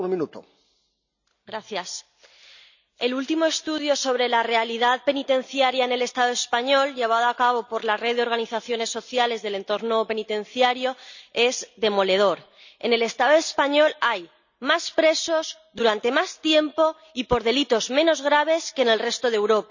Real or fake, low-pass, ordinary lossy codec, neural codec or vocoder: real; 7.2 kHz; none; none